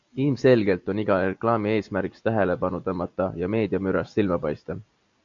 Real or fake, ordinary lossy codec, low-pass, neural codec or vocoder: real; MP3, 64 kbps; 7.2 kHz; none